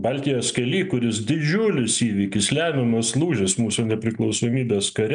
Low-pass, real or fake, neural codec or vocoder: 9.9 kHz; real; none